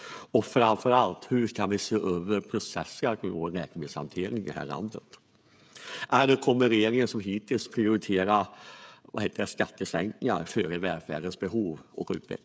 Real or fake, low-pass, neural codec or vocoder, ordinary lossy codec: fake; none; codec, 16 kHz, 16 kbps, FreqCodec, smaller model; none